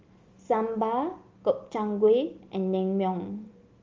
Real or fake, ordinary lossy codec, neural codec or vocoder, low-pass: real; Opus, 32 kbps; none; 7.2 kHz